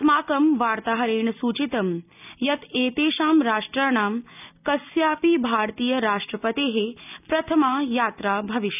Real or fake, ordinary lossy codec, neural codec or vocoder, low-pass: real; none; none; 3.6 kHz